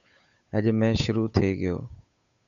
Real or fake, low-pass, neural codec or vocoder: fake; 7.2 kHz; codec, 16 kHz, 8 kbps, FunCodec, trained on Chinese and English, 25 frames a second